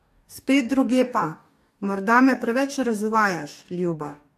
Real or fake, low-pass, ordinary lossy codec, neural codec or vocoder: fake; 14.4 kHz; AAC, 64 kbps; codec, 44.1 kHz, 2.6 kbps, DAC